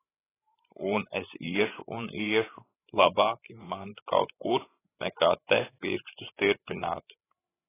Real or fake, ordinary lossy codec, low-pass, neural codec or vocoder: fake; AAC, 16 kbps; 3.6 kHz; codec, 16 kHz, 16 kbps, FreqCodec, larger model